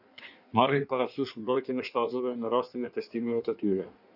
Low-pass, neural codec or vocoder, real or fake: 5.4 kHz; codec, 16 kHz in and 24 kHz out, 1.1 kbps, FireRedTTS-2 codec; fake